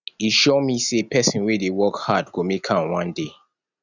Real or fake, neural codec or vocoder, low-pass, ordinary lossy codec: real; none; 7.2 kHz; none